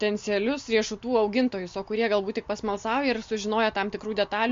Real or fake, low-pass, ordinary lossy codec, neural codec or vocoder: real; 7.2 kHz; MP3, 48 kbps; none